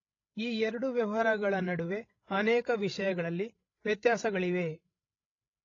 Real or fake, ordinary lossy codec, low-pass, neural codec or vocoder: fake; AAC, 32 kbps; 7.2 kHz; codec, 16 kHz, 16 kbps, FreqCodec, larger model